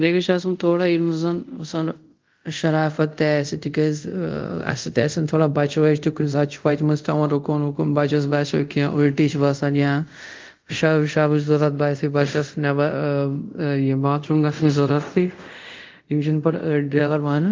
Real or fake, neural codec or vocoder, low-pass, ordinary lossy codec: fake; codec, 24 kHz, 0.5 kbps, DualCodec; 7.2 kHz; Opus, 32 kbps